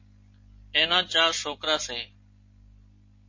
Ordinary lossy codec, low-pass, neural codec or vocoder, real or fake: MP3, 32 kbps; 7.2 kHz; none; real